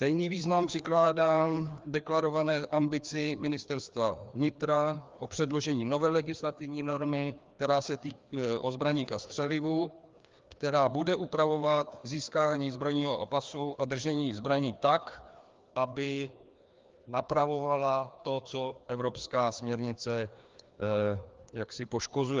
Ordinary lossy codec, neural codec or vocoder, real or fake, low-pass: Opus, 32 kbps; codec, 16 kHz, 2 kbps, FreqCodec, larger model; fake; 7.2 kHz